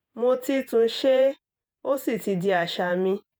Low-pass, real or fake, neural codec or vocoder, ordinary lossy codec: none; fake; vocoder, 48 kHz, 128 mel bands, Vocos; none